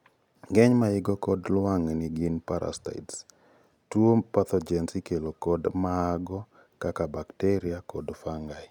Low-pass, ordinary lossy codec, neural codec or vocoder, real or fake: 19.8 kHz; none; none; real